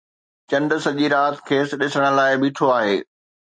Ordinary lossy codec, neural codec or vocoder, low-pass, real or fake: MP3, 48 kbps; none; 9.9 kHz; real